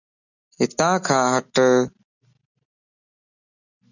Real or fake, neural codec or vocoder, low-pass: real; none; 7.2 kHz